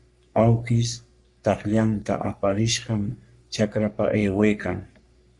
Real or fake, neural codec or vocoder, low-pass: fake; codec, 44.1 kHz, 3.4 kbps, Pupu-Codec; 10.8 kHz